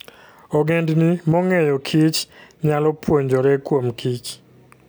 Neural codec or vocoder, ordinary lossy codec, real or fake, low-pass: none; none; real; none